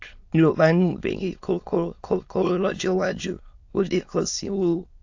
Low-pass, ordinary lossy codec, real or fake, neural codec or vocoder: 7.2 kHz; AAC, 48 kbps; fake; autoencoder, 22.05 kHz, a latent of 192 numbers a frame, VITS, trained on many speakers